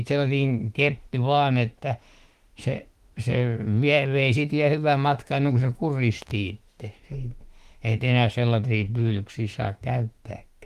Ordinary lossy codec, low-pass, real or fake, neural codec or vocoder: Opus, 24 kbps; 14.4 kHz; fake; autoencoder, 48 kHz, 32 numbers a frame, DAC-VAE, trained on Japanese speech